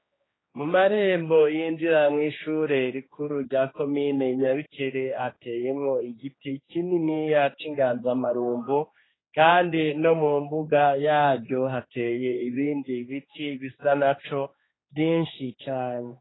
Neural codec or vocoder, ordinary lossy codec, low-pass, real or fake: codec, 16 kHz, 2 kbps, X-Codec, HuBERT features, trained on general audio; AAC, 16 kbps; 7.2 kHz; fake